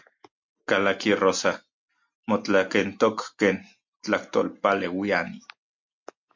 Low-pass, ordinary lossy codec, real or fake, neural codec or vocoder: 7.2 kHz; MP3, 48 kbps; real; none